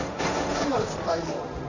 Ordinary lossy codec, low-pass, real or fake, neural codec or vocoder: none; 7.2 kHz; fake; codec, 16 kHz, 1.1 kbps, Voila-Tokenizer